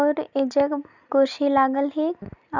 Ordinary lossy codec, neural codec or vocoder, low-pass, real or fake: none; none; 7.2 kHz; real